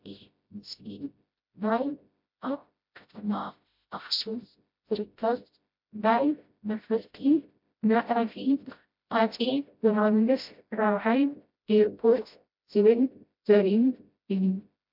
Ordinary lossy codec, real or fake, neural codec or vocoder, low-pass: MP3, 48 kbps; fake; codec, 16 kHz, 0.5 kbps, FreqCodec, smaller model; 5.4 kHz